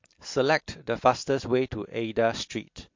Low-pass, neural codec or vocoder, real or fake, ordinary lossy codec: 7.2 kHz; none; real; MP3, 48 kbps